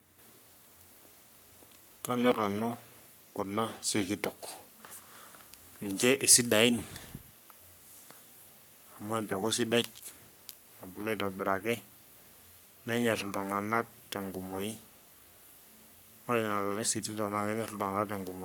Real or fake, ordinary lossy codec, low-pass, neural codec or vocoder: fake; none; none; codec, 44.1 kHz, 3.4 kbps, Pupu-Codec